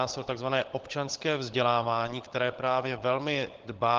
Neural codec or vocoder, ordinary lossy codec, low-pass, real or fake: codec, 16 kHz, 16 kbps, FunCodec, trained on LibriTTS, 50 frames a second; Opus, 16 kbps; 7.2 kHz; fake